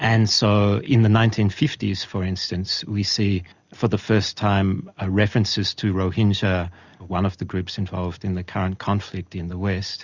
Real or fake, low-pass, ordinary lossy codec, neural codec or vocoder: real; 7.2 kHz; Opus, 64 kbps; none